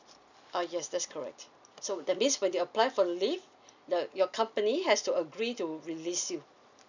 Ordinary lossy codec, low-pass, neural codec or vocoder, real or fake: none; 7.2 kHz; none; real